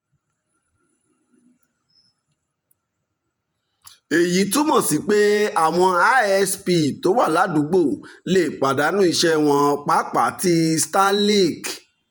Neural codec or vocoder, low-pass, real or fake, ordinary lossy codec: vocoder, 48 kHz, 128 mel bands, Vocos; none; fake; none